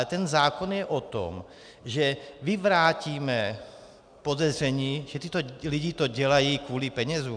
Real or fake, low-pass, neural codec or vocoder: real; 9.9 kHz; none